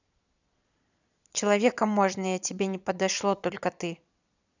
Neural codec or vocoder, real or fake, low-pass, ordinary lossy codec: vocoder, 22.05 kHz, 80 mel bands, Vocos; fake; 7.2 kHz; none